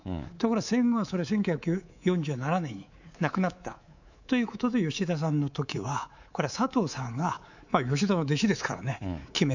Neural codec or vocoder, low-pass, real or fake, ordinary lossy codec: codec, 24 kHz, 3.1 kbps, DualCodec; 7.2 kHz; fake; none